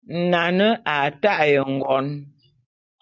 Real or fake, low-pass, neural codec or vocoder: real; 7.2 kHz; none